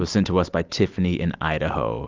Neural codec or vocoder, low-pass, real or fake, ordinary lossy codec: none; 7.2 kHz; real; Opus, 24 kbps